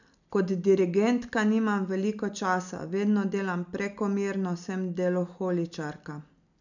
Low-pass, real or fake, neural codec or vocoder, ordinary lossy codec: 7.2 kHz; real; none; none